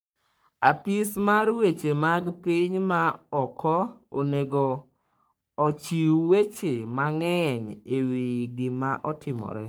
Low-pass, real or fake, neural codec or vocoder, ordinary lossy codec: none; fake; codec, 44.1 kHz, 3.4 kbps, Pupu-Codec; none